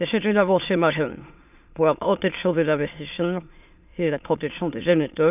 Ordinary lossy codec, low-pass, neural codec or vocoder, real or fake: none; 3.6 kHz; autoencoder, 22.05 kHz, a latent of 192 numbers a frame, VITS, trained on many speakers; fake